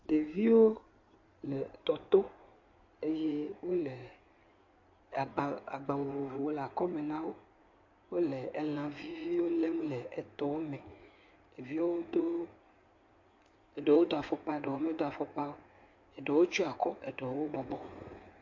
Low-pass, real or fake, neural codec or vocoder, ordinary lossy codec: 7.2 kHz; fake; codec, 16 kHz in and 24 kHz out, 2.2 kbps, FireRedTTS-2 codec; MP3, 48 kbps